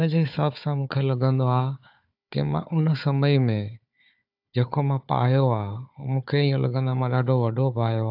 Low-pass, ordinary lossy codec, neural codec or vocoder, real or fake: 5.4 kHz; AAC, 48 kbps; codec, 16 kHz, 4 kbps, FunCodec, trained on Chinese and English, 50 frames a second; fake